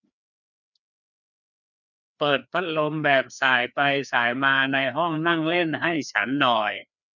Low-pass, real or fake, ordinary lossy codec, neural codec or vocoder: 7.2 kHz; fake; none; codec, 16 kHz, 2 kbps, FreqCodec, larger model